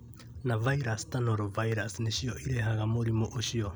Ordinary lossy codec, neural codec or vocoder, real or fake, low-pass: none; none; real; none